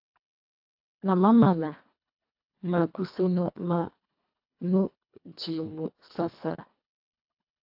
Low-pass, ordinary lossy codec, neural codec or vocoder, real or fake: 5.4 kHz; AAC, 48 kbps; codec, 24 kHz, 1.5 kbps, HILCodec; fake